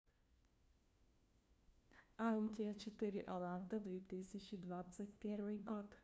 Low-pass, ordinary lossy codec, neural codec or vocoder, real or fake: none; none; codec, 16 kHz, 1 kbps, FunCodec, trained on LibriTTS, 50 frames a second; fake